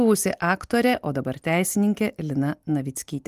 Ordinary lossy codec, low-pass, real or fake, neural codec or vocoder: Opus, 32 kbps; 14.4 kHz; real; none